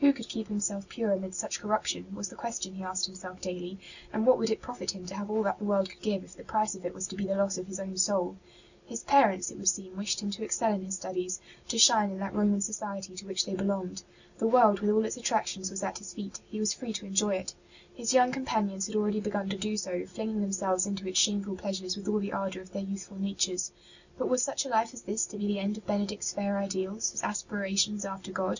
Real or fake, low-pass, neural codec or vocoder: real; 7.2 kHz; none